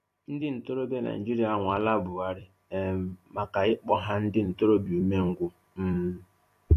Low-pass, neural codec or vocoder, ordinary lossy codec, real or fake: 14.4 kHz; vocoder, 44.1 kHz, 128 mel bands every 256 samples, BigVGAN v2; AAC, 64 kbps; fake